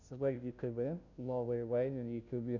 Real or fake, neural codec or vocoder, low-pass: fake; codec, 16 kHz, 0.5 kbps, FunCodec, trained on Chinese and English, 25 frames a second; 7.2 kHz